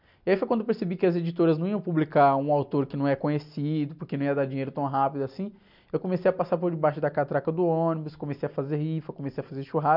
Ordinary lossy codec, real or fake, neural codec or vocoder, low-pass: none; real; none; 5.4 kHz